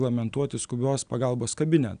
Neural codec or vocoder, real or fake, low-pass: none; real; 9.9 kHz